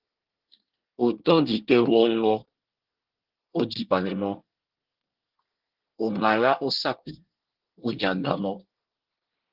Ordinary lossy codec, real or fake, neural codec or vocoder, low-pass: Opus, 16 kbps; fake; codec, 24 kHz, 1 kbps, SNAC; 5.4 kHz